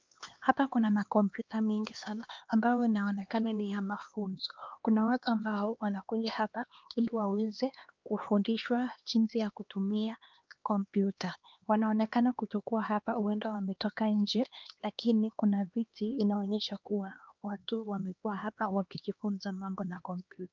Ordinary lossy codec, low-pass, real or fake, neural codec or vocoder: Opus, 24 kbps; 7.2 kHz; fake; codec, 16 kHz, 2 kbps, X-Codec, HuBERT features, trained on LibriSpeech